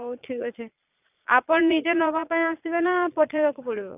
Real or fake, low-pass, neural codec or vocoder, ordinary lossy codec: fake; 3.6 kHz; vocoder, 22.05 kHz, 80 mel bands, Vocos; none